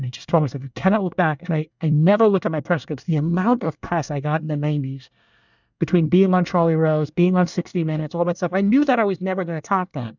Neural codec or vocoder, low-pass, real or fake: codec, 24 kHz, 1 kbps, SNAC; 7.2 kHz; fake